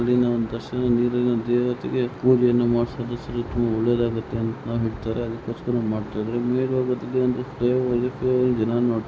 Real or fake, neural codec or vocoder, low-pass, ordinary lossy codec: real; none; none; none